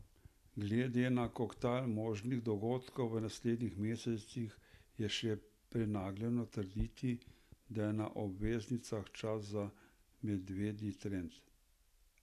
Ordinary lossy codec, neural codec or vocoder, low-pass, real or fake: none; none; 14.4 kHz; real